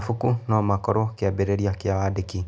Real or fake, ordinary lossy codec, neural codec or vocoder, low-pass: real; none; none; none